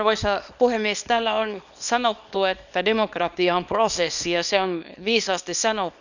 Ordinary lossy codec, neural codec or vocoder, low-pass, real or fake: none; codec, 24 kHz, 0.9 kbps, WavTokenizer, small release; 7.2 kHz; fake